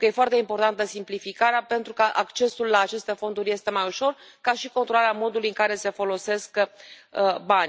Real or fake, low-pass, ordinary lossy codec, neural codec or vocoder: real; none; none; none